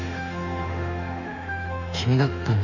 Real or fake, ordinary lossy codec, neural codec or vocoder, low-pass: fake; none; autoencoder, 48 kHz, 32 numbers a frame, DAC-VAE, trained on Japanese speech; 7.2 kHz